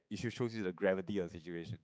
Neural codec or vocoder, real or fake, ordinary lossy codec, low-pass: codec, 16 kHz, 4 kbps, X-Codec, HuBERT features, trained on balanced general audio; fake; none; none